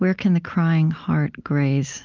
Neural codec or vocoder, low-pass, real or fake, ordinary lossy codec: none; 7.2 kHz; real; Opus, 24 kbps